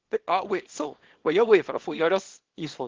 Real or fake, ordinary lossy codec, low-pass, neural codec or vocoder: fake; Opus, 16 kbps; 7.2 kHz; codec, 24 kHz, 0.9 kbps, WavTokenizer, small release